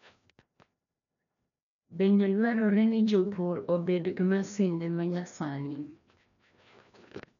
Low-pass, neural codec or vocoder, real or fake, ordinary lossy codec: 7.2 kHz; codec, 16 kHz, 1 kbps, FreqCodec, larger model; fake; none